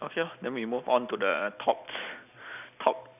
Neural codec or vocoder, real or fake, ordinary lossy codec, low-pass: none; real; none; 3.6 kHz